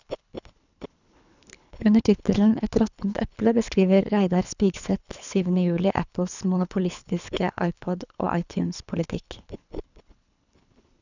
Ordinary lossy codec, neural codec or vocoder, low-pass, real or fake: none; codec, 24 kHz, 3 kbps, HILCodec; 7.2 kHz; fake